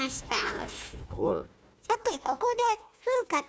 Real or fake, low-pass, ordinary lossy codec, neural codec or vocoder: fake; none; none; codec, 16 kHz, 1 kbps, FunCodec, trained on Chinese and English, 50 frames a second